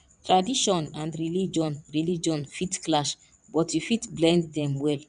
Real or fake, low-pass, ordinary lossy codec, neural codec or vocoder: fake; 9.9 kHz; none; vocoder, 22.05 kHz, 80 mel bands, WaveNeXt